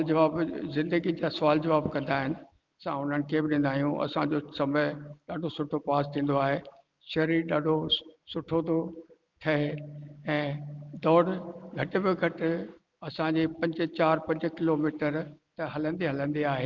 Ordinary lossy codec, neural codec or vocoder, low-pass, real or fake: Opus, 32 kbps; none; 7.2 kHz; real